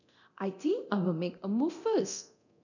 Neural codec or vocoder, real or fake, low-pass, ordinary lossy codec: codec, 24 kHz, 0.9 kbps, DualCodec; fake; 7.2 kHz; none